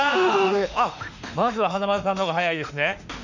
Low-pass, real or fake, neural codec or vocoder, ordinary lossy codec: 7.2 kHz; fake; autoencoder, 48 kHz, 32 numbers a frame, DAC-VAE, trained on Japanese speech; none